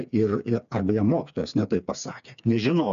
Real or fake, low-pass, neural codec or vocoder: fake; 7.2 kHz; codec, 16 kHz, 4 kbps, FreqCodec, smaller model